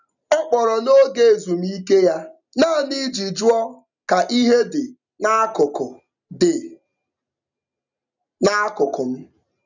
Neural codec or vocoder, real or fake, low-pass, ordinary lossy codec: none; real; 7.2 kHz; none